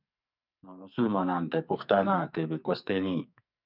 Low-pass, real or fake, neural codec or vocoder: 5.4 kHz; fake; codec, 44.1 kHz, 2.6 kbps, SNAC